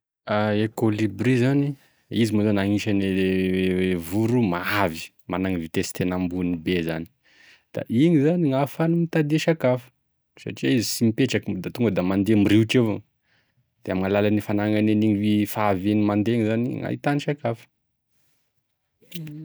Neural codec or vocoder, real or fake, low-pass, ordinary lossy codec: none; real; none; none